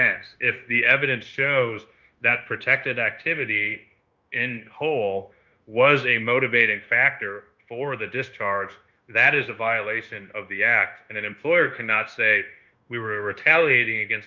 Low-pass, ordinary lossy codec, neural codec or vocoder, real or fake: 7.2 kHz; Opus, 24 kbps; codec, 16 kHz, 0.9 kbps, LongCat-Audio-Codec; fake